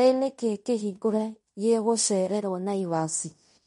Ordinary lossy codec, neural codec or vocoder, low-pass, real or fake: MP3, 48 kbps; codec, 16 kHz in and 24 kHz out, 0.9 kbps, LongCat-Audio-Codec, fine tuned four codebook decoder; 10.8 kHz; fake